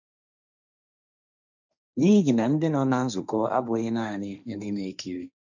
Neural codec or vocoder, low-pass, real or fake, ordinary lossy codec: codec, 16 kHz, 1.1 kbps, Voila-Tokenizer; 7.2 kHz; fake; none